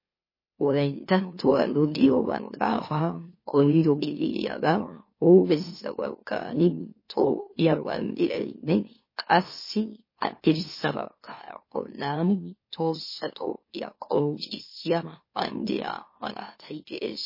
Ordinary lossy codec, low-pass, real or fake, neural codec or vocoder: MP3, 24 kbps; 5.4 kHz; fake; autoencoder, 44.1 kHz, a latent of 192 numbers a frame, MeloTTS